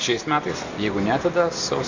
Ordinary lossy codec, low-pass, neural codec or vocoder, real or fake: AAC, 48 kbps; 7.2 kHz; none; real